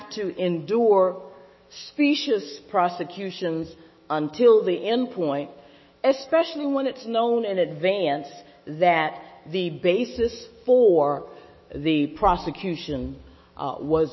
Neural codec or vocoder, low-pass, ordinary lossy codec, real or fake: autoencoder, 48 kHz, 128 numbers a frame, DAC-VAE, trained on Japanese speech; 7.2 kHz; MP3, 24 kbps; fake